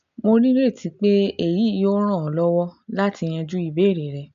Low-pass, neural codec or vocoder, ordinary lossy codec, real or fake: 7.2 kHz; none; MP3, 64 kbps; real